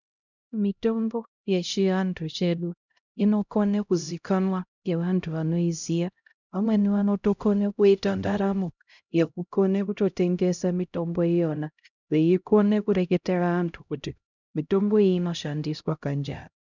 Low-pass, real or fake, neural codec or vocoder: 7.2 kHz; fake; codec, 16 kHz, 0.5 kbps, X-Codec, HuBERT features, trained on LibriSpeech